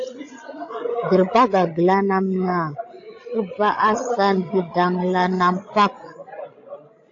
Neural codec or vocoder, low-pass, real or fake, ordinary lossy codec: codec, 16 kHz, 16 kbps, FreqCodec, larger model; 7.2 kHz; fake; AAC, 48 kbps